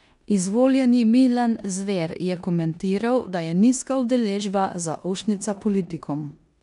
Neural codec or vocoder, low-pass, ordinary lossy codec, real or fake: codec, 16 kHz in and 24 kHz out, 0.9 kbps, LongCat-Audio-Codec, four codebook decoder; 10.8 kHz; none; fake